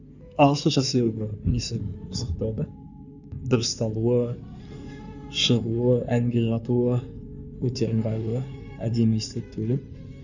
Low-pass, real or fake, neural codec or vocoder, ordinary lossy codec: 7.2 kHz; fake; codec, 16 kHz in and 24 kHz out, 2.2 kbps, FireRedTTS-2 codec; none